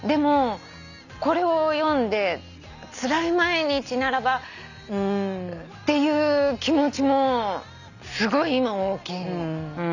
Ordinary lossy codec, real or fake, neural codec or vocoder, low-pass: none; real; none; 7.2 kHz